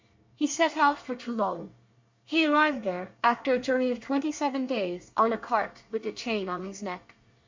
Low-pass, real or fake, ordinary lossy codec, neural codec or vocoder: 7.2 kHz; fake; MP3, 64 kbps; codec, 24 kHz, 1 kbps, SNAC